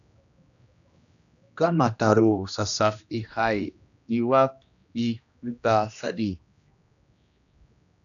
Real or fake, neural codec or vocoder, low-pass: fake; codec, 16 kHz, 1 kbps, X-Codec, HuBERT features, trained on general audio; 7.2 kHz